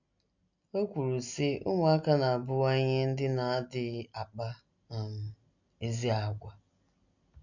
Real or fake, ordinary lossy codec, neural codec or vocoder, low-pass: real; none; none; 7.2 kHz